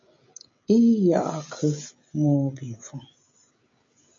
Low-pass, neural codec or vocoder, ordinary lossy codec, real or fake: 7.2 kHz; codec, 16 kHz, 16 kbps, FreqCodec, smaller model; MP3, 48 kbps; fake